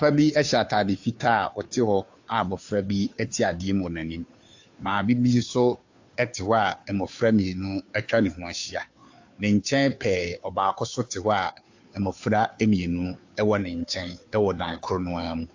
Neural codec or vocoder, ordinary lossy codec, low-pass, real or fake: codec, 16 kHz, 2 kbps, FunCodec, trained on Chinese and English, 25 frames a second; AAC, 48 kbps; 7.2 kHz; fake